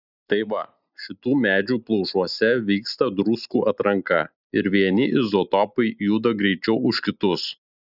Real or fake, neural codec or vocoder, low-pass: real; none; 5.4 kHz